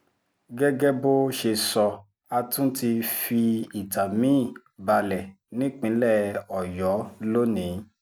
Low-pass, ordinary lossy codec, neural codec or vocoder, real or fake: none; none; none; real